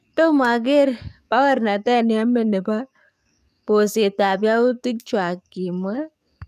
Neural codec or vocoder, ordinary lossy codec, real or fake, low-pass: codec, 44.1 kHz, 7.8 kbps, DAC; none; fake; 14.4 kHz